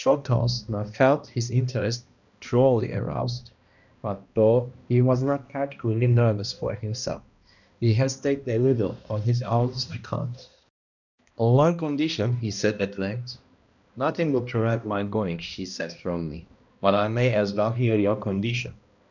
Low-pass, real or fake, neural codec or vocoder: 7.2 kHz; fake; codec, 16 kHz, 1 kbps, X-Codec, HuBERT features, trained on balanced general audio